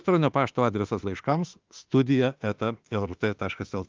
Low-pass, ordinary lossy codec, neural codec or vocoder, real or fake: 7.2 kHz; Opus, 32 kbps; autoencoder, 48 kHz, 32 numbers a frame, DAC-VAE, trained on Japanese speech; fake